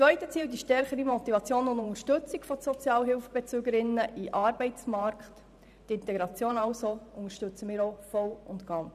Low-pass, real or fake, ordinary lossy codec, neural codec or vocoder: 14.4 kHz; fake; none; vocoder, 44.1 kHz, 128 mel bands every 512 samples, BigVGAN v2